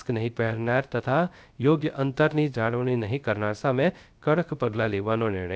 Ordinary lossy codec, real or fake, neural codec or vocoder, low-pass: none; fake; codec, 16 kHz, 0.3 kbps, FocalCodec; none